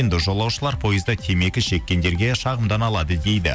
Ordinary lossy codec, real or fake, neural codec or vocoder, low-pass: none; real; none; none